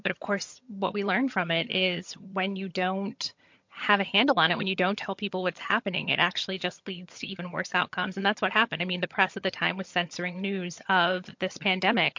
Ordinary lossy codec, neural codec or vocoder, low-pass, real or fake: MP3, 48 kbps; vocoder, 22.05 kHz, 80 mel bands, HiFi-GAN; 7.2 kHz; fake